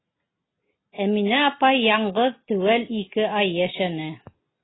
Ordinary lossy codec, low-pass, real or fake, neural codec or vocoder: AAC, 16 kbps; 7.2 kHz; real; none